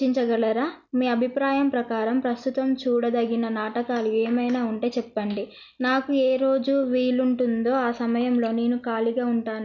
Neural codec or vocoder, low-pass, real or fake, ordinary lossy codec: none; 7.2 kHz; real; none